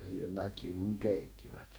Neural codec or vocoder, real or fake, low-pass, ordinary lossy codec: codec, 44.1 kHz, 2.6 kbps, DAC; fake; none; none